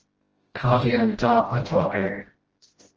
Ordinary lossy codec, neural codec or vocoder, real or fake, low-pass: Opus, 16 kbps; codec, 16 kHz, 0.5 kbps, FreqCodec, smaller model; fake; 7.2 kHz